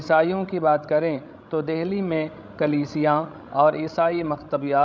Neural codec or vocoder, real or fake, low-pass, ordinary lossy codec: codec, 16 kHz, 16 kbps, FreqCodec, larger model; fake; none; none